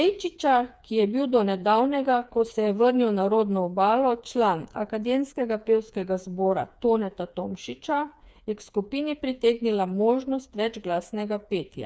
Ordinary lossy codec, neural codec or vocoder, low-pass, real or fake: none; codec, 16 kHz, 4 kbps, FreqCodec, smaller model; none; fake